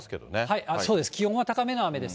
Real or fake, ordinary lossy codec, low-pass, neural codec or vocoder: real; none; none; none